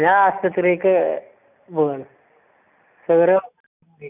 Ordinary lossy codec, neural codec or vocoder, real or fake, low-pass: none; none; real; 3.6 kHz